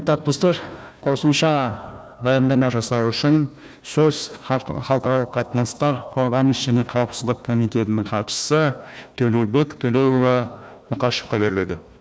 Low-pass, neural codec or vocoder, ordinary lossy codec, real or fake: none; codec, 16 kHz, 1 kbps, FunCodec, trained on Chinese and English, 50 frames a second; none; fake